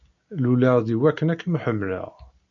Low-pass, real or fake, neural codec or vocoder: 7.2 kHz; real; none